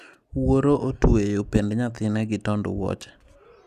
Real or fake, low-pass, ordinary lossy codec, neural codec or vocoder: real; 14.4 kHz; none; none